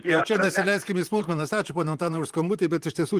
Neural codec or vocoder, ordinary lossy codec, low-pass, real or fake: vocoder, 44.1 kHz, 128 mel bands, Pupu-Vocoder; Opus, 24 kbps; 14.4 kHz; fake